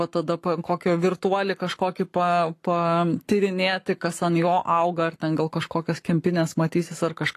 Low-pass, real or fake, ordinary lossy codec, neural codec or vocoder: 14.4 kHz; fake; AAC, 48 kbps; codec, 44.1 kHz, 7.8 kbps, Pupu-Codec